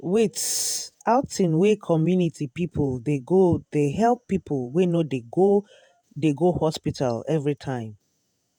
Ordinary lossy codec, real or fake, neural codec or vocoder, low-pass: none; fake; vocoder, 48 kHz, 128 mel bands, Vocos; none